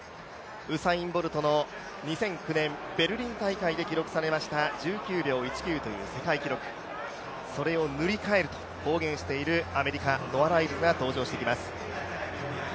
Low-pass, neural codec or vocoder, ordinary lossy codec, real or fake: none; none; none; real